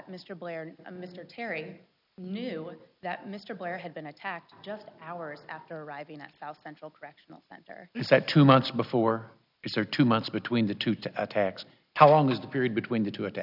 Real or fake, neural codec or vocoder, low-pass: real; none; 5.4 kHz